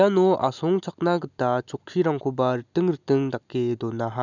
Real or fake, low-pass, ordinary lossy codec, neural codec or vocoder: real; 7.2 kHz; none; none